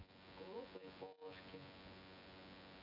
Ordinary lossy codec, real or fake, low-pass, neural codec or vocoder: none; fake; 5.4 kHz; vocoder, 24 kHz, 100 mel bands, Vocos